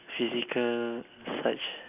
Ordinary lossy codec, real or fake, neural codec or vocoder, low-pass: none; real; none; 3.6 kHz